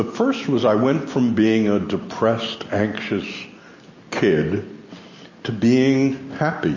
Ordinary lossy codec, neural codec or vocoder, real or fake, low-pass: MP3, 32 kbps; none; real; 7.2 kHz